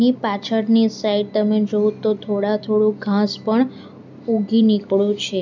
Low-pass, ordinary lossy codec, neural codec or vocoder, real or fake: 7.2 kHz; none; none; real